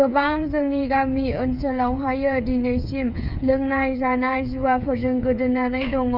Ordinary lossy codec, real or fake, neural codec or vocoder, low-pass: none; fake; codec, 16 kHz, 8 kbps, FreqCodec, smaller model; 5.4 kHz